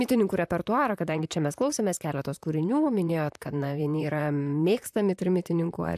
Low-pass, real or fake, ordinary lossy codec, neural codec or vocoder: 14.4 kHz; fake; AAC, 96 kbps; vocoder, 44.1 kHz, 128 mel bands, Pupu-Vocoder